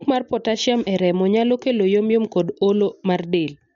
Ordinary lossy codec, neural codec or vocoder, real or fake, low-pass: MP3, 48 kbps; none; real; 7.2 kHz